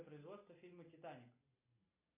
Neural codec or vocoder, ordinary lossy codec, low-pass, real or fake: none; MP3, 24 kbps; 3.6 kHz; real